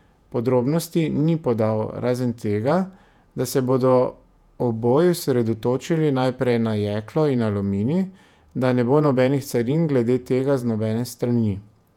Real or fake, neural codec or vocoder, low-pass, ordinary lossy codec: fake; vocoder, 48 kHz, 128 mel bands, Vocos; 19.8 kHz; none